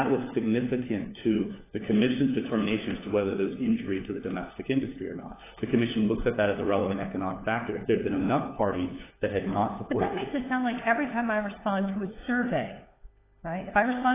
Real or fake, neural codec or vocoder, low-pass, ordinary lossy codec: fake; codec, 16 kHz, 4 kbps, FunCodec, trained on LibriTTS, 50 frames a second; 3.6 kHz; AAC, 16 kbps